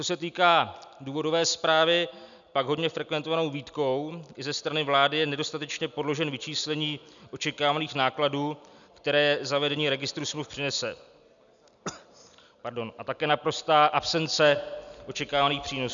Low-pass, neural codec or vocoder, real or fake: 7.2 kHz; none; real